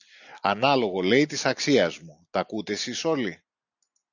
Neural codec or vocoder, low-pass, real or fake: none; 7.2 kHz; real